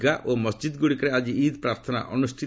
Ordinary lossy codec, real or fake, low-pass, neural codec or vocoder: none; real; none; none